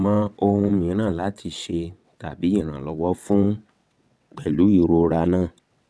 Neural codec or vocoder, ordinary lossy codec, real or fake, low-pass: vocoder, 22.05 kHz, 80 mel bands, WaveNeXt; none; fake; none